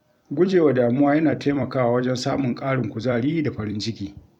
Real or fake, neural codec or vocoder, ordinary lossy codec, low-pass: fake; vocoder, 44.1 kHz, 128 mel bands every 256 samples, BigVGAN v2; none; 19.8 kHz